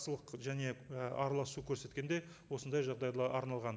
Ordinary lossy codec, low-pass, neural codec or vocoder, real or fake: none; none; none; real